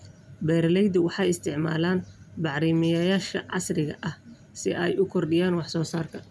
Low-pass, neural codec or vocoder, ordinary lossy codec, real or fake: none; none; none; real